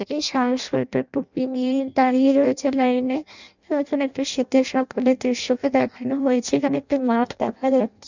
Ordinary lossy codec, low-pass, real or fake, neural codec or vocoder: none; 7.2 kHz; fake; codec, 16 kHz in and 24 kHz out, 0.6 kbps, FireRedTTS-2 codec